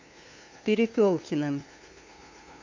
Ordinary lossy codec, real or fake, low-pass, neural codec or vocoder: MP3, 48 kbps; fake; 7.2 kHz; codec, 16 kHz, 2 kbps, FunCodec, trained on LibriTTS, 25 frames a second